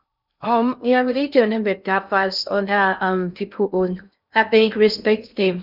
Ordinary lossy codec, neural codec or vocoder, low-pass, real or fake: none; codec, 16 kHz in and 24 kHz out, 0.6 kbps, FocalCodec, streaming, 2048 codes; 5.4 kHz; fake